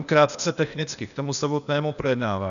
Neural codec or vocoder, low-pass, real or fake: codec, 16 kHz, 0.8 kbps, ZipCodec; 7.2 kHz; fake